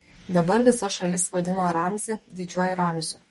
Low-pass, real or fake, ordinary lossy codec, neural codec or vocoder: 19.8 kHz; fake; MP3, 48 kbps; codec, 44.1 kHz, 2.6 kbps, DAC